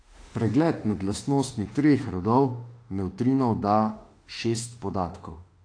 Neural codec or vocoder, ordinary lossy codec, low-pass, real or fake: autoencoder, 48 kHz, 32 numbers a frame, DAC-VAE, trained on Japanese speech; AAC, 48 kbps; 9.9 kHz; fake